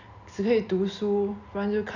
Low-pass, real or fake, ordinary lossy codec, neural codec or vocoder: 7.2 kHz; real; none; none